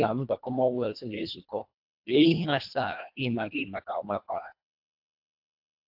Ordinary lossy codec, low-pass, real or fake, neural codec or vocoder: AAC, 48 kbps; 5.4 kHz; fake; codec, 24 kHz, 1.5 kbps, HILCodec